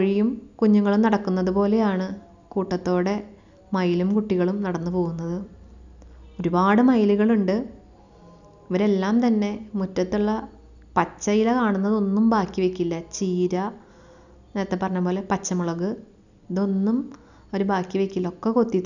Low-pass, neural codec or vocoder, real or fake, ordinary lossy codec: 7.2 kHz; none; real; none